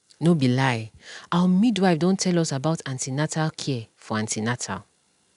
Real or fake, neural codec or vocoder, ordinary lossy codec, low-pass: real; none; none; 10.8 kHz